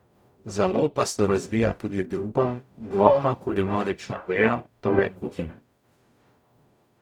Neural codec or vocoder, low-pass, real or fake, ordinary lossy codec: codec, 44.1 kHz, 0.9 kbps, DAC; 19.8 kHz; fake; none